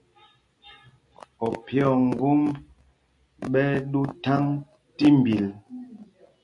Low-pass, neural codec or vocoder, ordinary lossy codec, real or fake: 10.8 kHz; none; AAC, 64 kbps; real